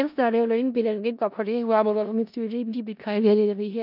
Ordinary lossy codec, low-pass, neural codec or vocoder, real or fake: none; 5.4 kHz; codec, 16 kHz in and 24 kHz out, 0.4 kbps, LongCat-Audio-Codec, four codebook decoder; fake